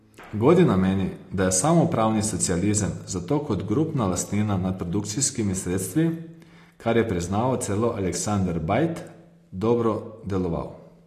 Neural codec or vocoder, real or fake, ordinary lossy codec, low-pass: none; real; AAC, 48 kbps; 14.4 kHz